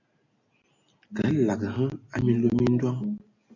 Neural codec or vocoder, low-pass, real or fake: none; 7.2 kHz; real